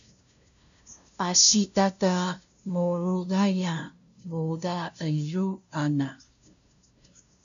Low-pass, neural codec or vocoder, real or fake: 7.2 kHz; codec, 16 kHz, 0.5 kbps, FunCodec, trained on LibriTTS, 25 frames a second; fake